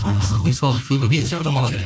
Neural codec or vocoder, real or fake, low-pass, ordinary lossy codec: codec, 16 kHz, 1 kbps, FunCodec, trained on Chinese and English, 50 frames a second; fake; none; none